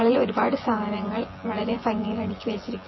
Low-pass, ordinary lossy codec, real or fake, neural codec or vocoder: 7.2 kHz; MP3, 24 kbps; fake; vocoder, 24 kHz, 100 mel bands, Vocos